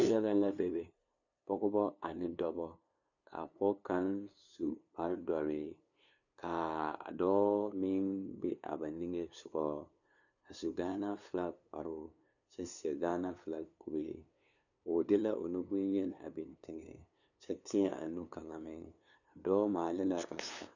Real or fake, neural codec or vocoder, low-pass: fake; codec, 16 kHz, 2 kbps, FunCodec, trained on LibriTTS, 25 frames a second; 7.2 kHz